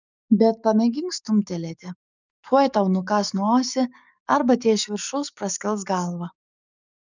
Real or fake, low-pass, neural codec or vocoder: fake; 7.2 kHz; codec, 44.1 kHz, 7.8 kbps, DAC